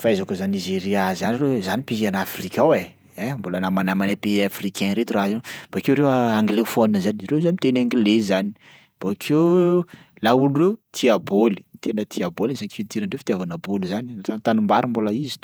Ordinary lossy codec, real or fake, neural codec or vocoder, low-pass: none; fake; vocoder, 48 kHz, 128 mel bands, Vocos; none